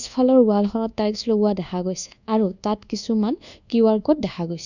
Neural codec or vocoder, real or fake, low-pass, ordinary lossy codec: codec, 24 kHz, 1.2 kbps, DualCodec; fake; 7.2 kHz; none